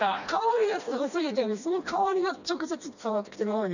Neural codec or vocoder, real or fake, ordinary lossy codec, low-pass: codec, 16 kHz, 1 kbps, FreqCodec, smaller model; fake; none; 7.2 kHz